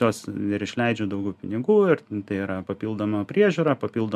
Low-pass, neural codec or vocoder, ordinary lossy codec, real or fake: 14.4 kHz; none; MP3, 96 kbps; real